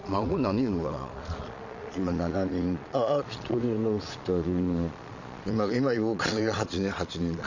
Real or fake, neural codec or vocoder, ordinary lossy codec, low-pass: fake; vocoder, 22.05 kHz, 80 mel bands, Vocos; Opus, 64 kbps; 7.2 kHz